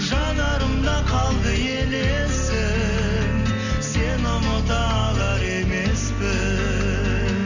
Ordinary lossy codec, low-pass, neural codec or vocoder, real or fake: none; 7.2 kHz; none; real